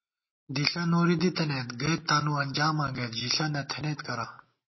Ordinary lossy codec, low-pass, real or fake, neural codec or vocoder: MP3, 24 kbps; 7.2 kHz; real; none